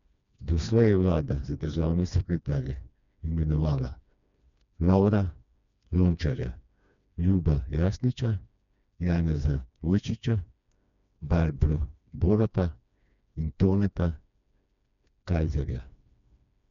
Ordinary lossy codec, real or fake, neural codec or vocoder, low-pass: none; fake; codec, 16 kHz, 2 kbps, FreqCodec, smaller model; 7.2 kHz